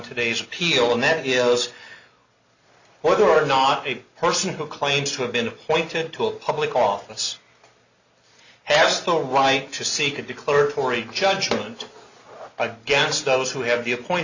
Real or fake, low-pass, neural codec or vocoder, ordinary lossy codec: real; 7.2 kHz; none; Opus, 64 kbps